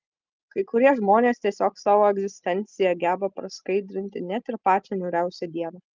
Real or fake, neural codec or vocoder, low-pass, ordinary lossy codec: real; none; 7.2 kHz; Opus, 32 kbps